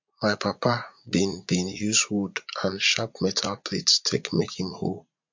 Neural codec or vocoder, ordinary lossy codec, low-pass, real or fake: vocoder, 44.1 kHz, 80 mel bands, Vocos; MP3, 48 kbps; 7.2 kHz; fake